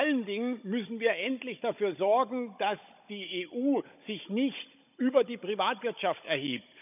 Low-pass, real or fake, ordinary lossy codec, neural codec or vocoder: 3.6 kHz; fake; none; codec, 16 kHz, 16 kbps, FunCodec, trained on Chinese and English, 50 frames a second